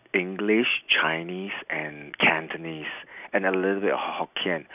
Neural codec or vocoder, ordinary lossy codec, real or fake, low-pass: none; none; real; 3.6 kHz